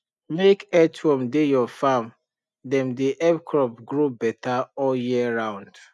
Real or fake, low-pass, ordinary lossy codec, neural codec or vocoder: real; none; none; none